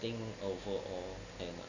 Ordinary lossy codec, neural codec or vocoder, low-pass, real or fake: none; none; 7.2 kHz; real